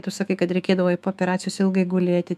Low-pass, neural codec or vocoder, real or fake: 14.4 kHz; autoencoder, 48 kHz, 128 numbers a frame, DAC-VAE, trained on Japanese speech; fake